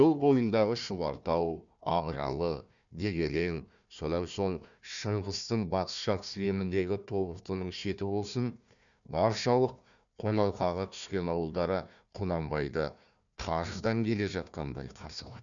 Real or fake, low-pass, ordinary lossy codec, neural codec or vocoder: fake; 7.2 kHz; none; codec, 16 kHz, 1 kbps, FunCodec, trained on Chinese and English, 50 frames a second